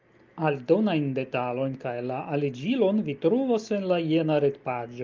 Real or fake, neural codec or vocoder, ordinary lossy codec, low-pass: real; none; Opus, 24 kbps; 7.2 kHz